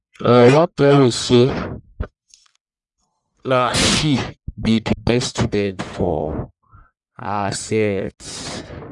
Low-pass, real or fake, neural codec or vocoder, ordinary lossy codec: 10.8 kHz; fake; codec, 44.1 kHz, 1.7 kbps, Pupu-Codec; none